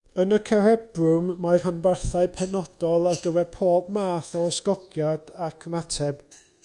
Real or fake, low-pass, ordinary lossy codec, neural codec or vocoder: fake; 10.8 kHz; Opus, 64 kbps; codec, 24 kHz, 1.2 kbps, DualCodec